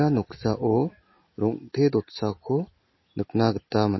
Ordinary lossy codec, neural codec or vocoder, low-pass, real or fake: MP3, 24 kbps; none; 7.2 kHz; real